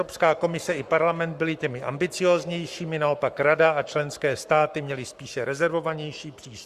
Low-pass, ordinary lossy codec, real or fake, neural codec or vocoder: 14.4 kHz; Opus, 64 kbps; fake; codec, 44.1 kHz, 7.8 kbps, Pupu-Codec